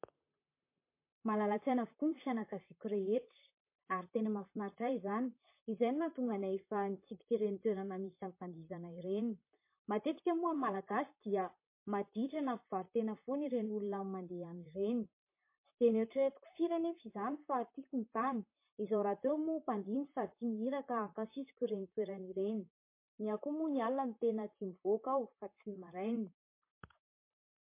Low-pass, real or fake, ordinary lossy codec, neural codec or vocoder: 3.6 kHz; fake; MP3, 24 kbps; vocoder, 44.1 kHz, 128 mel bands, Pupu-Vocoder